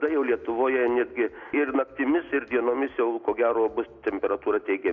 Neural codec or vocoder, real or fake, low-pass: none; real; 7.2 kHz